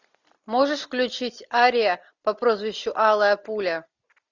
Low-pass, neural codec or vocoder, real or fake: 7.2 kHz; none; real